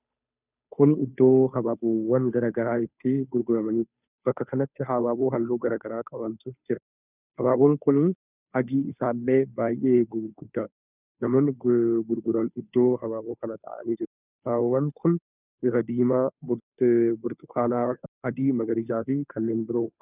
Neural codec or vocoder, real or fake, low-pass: codec, 16 kHz, 2 kbps, FunCodec, trained on Chinese and English, 25 frames a second; fake; 3.6 kHz